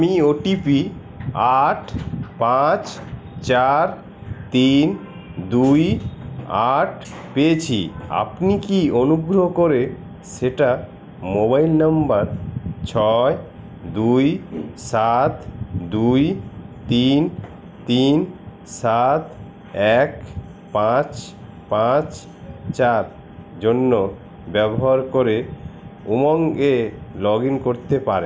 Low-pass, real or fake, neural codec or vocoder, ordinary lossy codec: none; real; none; none